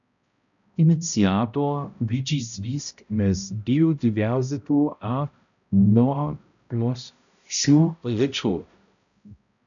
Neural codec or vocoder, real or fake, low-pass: codec, 16 kHz, 0.5 kbps, X-Codec, HuBERT features, trained on balanced general audio; fake; 7.2 kHz